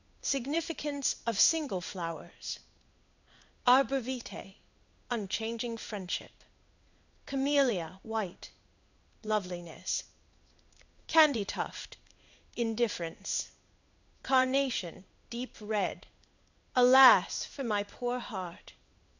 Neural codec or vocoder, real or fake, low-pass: codec, 16 kHz in and 24 kHz out, 1 kbps, XY-Tokenizer; fake; 7.2 kHz